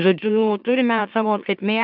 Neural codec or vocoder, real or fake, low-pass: autoencoder, 44.1 kHz, a latent of 192 numbers a frame, MeloTTS; fake; 5.4 kHz